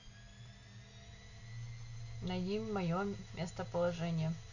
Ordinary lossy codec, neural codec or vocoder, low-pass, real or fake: none; none; 7.2 kHz; real